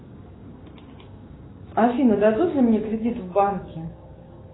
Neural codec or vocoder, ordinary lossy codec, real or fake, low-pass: codec, 16 kHz, 6 kbps, DAC; AAC, 16 kbps; fake; 7.2 kHz